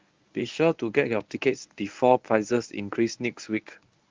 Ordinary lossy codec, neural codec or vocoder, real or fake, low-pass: Opus, 24 kbps; codec, 24 kHz, 0.9 kbps, WavTokenizer, medium speech release version 1; fake; 7.2 kHz